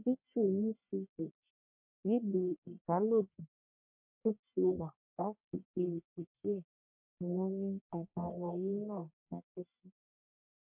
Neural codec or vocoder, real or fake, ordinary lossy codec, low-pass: codec, 44.1 kHz, 1.7 kbps, Pupu-Codec; fake; MP3, 32 kbps; 3.6 kHz